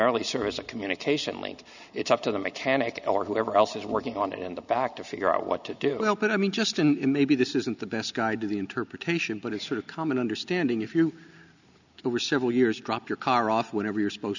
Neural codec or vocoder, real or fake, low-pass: none; real; 7.2 kHz